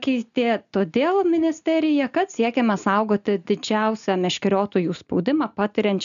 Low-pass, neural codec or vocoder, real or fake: 7.2 kHz; none; real